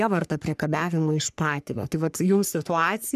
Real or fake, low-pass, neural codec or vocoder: fake; 14.4 kHz; codec, 44.1 kHz, 3.4 kbps, Pupu-Codec